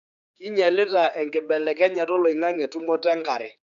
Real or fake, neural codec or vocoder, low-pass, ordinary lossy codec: fake; codec, 16 kHz, 4 kbps, X-Codec, HuBERT features, trained on general audio; 7.2 kHz; none